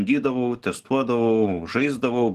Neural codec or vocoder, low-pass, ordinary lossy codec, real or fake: codec, 44.1 kHz, 7.8 kbps, DAC; 14.4 kHz; Opus, 16 kbps; fake